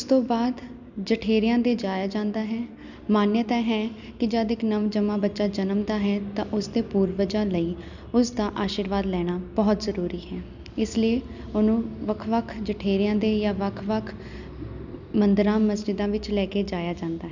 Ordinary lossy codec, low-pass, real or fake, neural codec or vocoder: none; 7.2 kHz; real; none